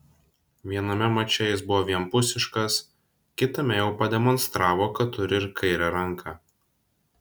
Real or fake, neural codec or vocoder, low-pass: real; none; 19.8 kHz